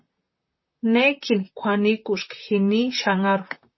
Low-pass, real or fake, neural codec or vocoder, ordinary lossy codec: 7.2 kHz; fake; vocoder, 44.1 kHz, 128 mel bands, Pupu-Vocoder; MP3, 24 kbps